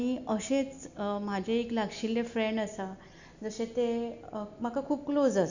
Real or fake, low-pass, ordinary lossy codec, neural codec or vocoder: real; 7.2 kHz; none; none